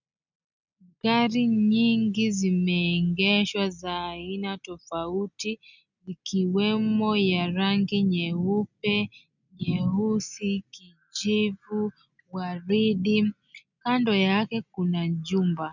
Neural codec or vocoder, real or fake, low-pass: none; real; 7.2 kHz